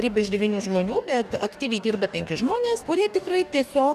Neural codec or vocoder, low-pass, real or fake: codec, 44.1 kHz, 2.6 kbps, DAC; 14.4 kHz; fake